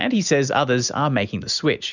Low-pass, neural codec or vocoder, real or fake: 7.2 kHz; none; real